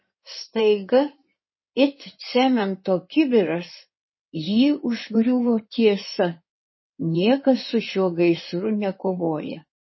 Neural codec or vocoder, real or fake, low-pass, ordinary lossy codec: codec, 16 kHz in and 24 kHz out, 2.2 kbps, FireRedTTS-2 codec; fake; 7.2 kHz; MP3, 24 kbps